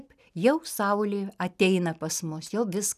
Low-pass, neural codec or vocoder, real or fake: 14.4 kHz; none; real